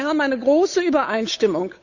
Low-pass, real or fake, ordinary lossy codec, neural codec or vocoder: 7.2 kHz; fake; Opus, 64 kbps; codec, 16 kHz, 8 kbps, FunCodec, trained on Chinese and English, 25 frames a second